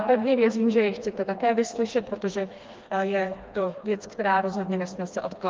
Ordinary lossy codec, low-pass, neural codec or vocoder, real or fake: Opus, 24 kbps; 7.2 kHz; codec, 16 kHz, 2 kbps, FreqCodec, smaller model; fake